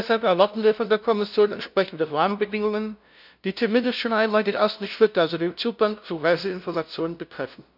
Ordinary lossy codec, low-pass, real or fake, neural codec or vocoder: none; 5.4 kHz; fake; codec, 16 kHz, 0.5 kbps, FunCodec, trained on LibriTTS, 25 frames a second